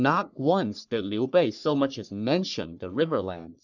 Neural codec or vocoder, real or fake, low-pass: codec, 44.1 kHz, 3.4 kbps, Pupu-Codec; fake; 7.2 kHz